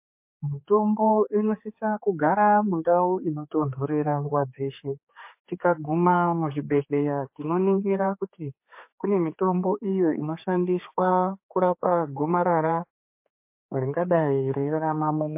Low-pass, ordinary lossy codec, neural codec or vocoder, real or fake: 3.6 kHz; MP3, 24 kbps; codec, 16 kHz, 4 kbps, X-Codec, HuBERT features, trained on general audio; fake